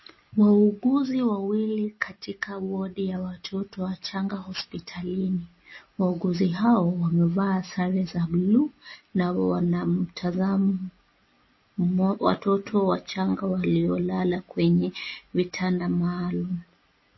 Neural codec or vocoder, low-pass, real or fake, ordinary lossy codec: none; 7.2 kHz; real; MP3, 24 kbps